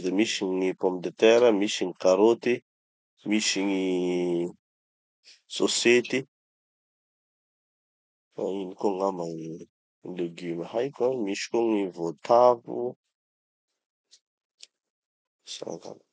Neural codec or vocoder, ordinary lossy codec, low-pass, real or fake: none; none; none; real